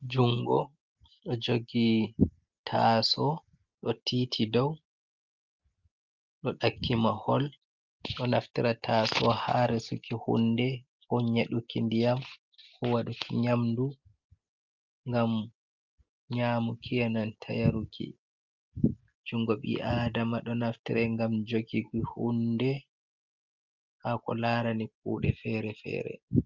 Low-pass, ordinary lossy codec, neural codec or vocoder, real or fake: 7.2 kHz; Opus, 24 kbps; none; real